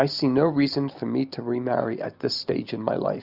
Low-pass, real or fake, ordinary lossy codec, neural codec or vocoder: 5.4 kHz; real; Opus, 64 kbps; none